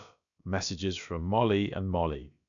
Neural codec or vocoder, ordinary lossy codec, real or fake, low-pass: codec, 16 kHz, about 1 kbps, DyCAST, with the encoder's durations; none; fake; 7.2 kHz